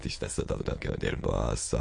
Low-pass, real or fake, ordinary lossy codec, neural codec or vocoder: 9.9 kHz; fake; MP3, 48 kbps; autoencoder, 22.05 kHz, a latent of 192 numbers a frame, VITS, trained on many speakers